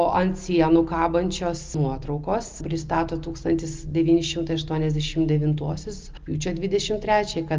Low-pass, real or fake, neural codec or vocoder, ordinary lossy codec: 7.2 kHz; real; none; Opus, 32 kbps